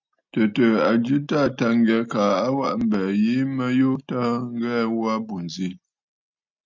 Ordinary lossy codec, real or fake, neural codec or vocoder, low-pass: MP3, 64 kbps; real; none; 7.2 kHz